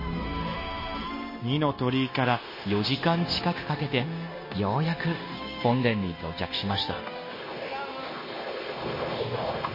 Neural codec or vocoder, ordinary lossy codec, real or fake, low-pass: codec, 16 kHz, 0.9 kbps, LongCat-Audio-Codec; MP3, 24 kbps; fake; 5.4 kHz